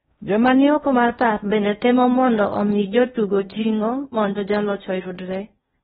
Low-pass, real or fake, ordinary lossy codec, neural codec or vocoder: 10.8 kHz; fake; AAC, 16 kbps; codec, 16 kHz in and 24 kHz out, 0.8 kbps, FocalCodec, streaming, 65536 codes